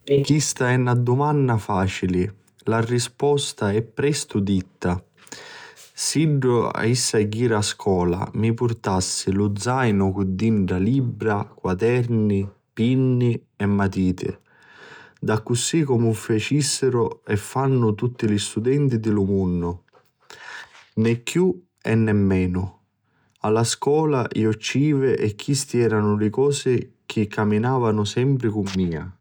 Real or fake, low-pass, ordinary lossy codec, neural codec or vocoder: real; none; none; none